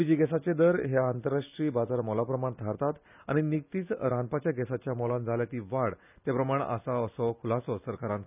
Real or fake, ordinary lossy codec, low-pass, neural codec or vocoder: real; none; 3.6 kHz; none